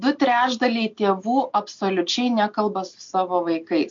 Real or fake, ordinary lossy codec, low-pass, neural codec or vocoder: real; MP3, 48 kbps; 7.2 kHz; none